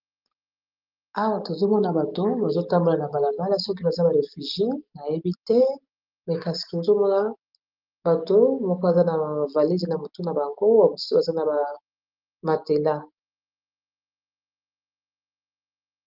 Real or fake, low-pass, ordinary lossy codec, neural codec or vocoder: real; 5.4 kHz; Opus, 24 kbps; none